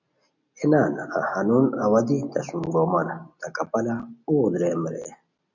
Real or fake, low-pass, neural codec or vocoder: real; 7.2 kHz; none